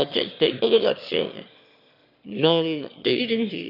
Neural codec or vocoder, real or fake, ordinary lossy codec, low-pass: autoencoder, 22.05 kHz, a latent of 192 numbers a frame, VITS, trained on one speaker; fake; none; 5.4 kHz